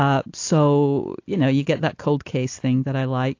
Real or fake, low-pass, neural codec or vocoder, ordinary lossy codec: real; 7.2 kHz; none; AAC, 48 kbps